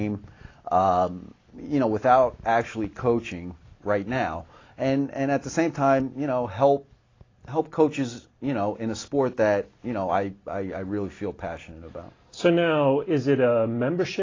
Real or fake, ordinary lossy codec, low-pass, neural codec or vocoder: real; AAC, 32 kbps; 7.2 kHz; none